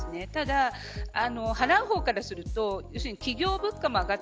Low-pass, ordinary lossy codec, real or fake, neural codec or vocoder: none; none; real; none